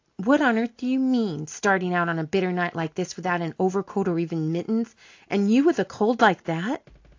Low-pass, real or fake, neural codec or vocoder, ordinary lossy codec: 7.2 kHz; real; none; AAC, 48 kbps